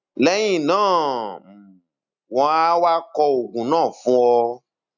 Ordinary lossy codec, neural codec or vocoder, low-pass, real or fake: none; none; 7.2 kHz; real